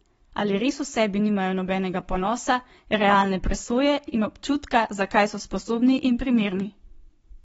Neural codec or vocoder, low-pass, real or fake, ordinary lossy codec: codec, 44.1 kHz, 7.8 kbps, Pupu-Codec; 19.8 kHz; fake; AAC, 24 kbps